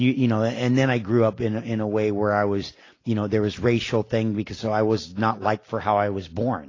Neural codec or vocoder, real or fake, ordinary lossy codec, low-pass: none; real; AAC, 32 kbps; 7.2 kHz